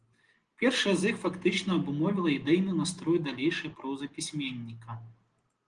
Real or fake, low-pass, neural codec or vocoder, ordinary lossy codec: real; 9.9 kHz; none; Opus, 24 kbps